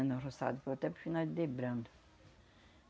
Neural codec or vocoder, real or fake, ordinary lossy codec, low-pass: none; real; none; none